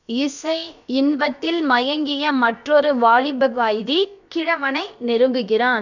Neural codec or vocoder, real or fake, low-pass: codec, 16 kHz, about 1 kbps, DyCAST, with the encoder's durations; fake; 7.2 kHz